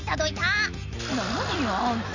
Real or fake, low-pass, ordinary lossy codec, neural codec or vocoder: real; 7.2 kHz; none; none